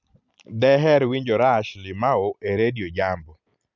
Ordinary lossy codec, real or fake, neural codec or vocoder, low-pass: none; real; none; 7.2 kHz